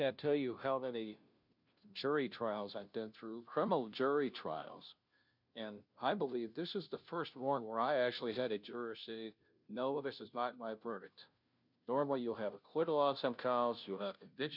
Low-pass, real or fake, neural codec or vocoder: 5.4 kHz; fake; codec, 16 kHz, 0.5 kbps, FunCodec, trained on Chinese and English, 25 frames a second